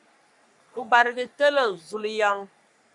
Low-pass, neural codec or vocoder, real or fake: 10.8 kHz; codec, 44.1 kHz, 3.4 kbps, Pupu-Codec; fake